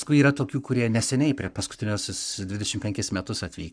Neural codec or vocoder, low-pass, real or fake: codec, 44.1 kHz, 7.8 kbps, Pupu-Codec; 9.9 kHz; fake